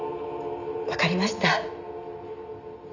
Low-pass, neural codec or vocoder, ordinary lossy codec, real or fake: 7.2 kHz; none; none; real